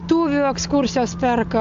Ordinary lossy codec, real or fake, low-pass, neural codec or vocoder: AAC, 64 kbps; real; 7.2 kHz; none